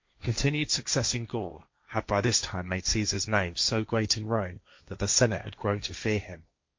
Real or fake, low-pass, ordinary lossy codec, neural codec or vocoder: fake; 7.2 kHz; MP3, 48 kbps; codec, 16 kHz, 1.1 kbps, Voila-Tokenizer